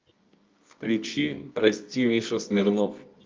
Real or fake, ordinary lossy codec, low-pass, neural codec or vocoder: fake; Opus, 24 kbps; 7.2 kHz; codec, 24 kHz, 0.9 kbps, WavTokenizer, medium music audio release